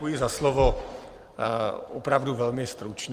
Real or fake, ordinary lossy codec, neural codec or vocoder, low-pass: fake; Opus, 32 kbps; vocoder, 44.1 kHz, 128 mel bands every 512 samples, BigVGAN v2; 14.4 kHz